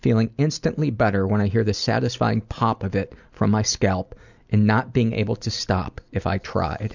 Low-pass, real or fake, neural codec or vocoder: 7.2 kHz; real; none